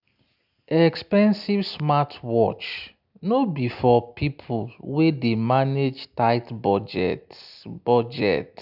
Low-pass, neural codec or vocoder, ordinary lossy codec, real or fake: 5.4 kHz; none; none; real